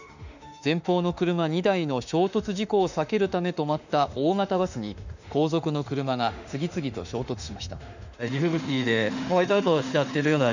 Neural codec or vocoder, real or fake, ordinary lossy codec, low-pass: autoencoder, 48 kHz, 32 numbers a frame, DAC-VAE, trained on Japanese speech; fake; none; 7.2 kHz